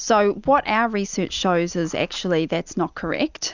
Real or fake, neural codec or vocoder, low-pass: real; none; 7.2 kHz